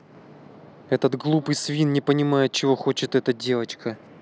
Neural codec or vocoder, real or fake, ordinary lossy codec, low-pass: none; real; none; none